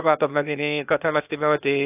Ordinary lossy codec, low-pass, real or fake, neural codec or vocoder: AAC, 32 kbps; 3.6 kHz; fake; codec, 16 kHz, 0.8 kbps, ZipCodec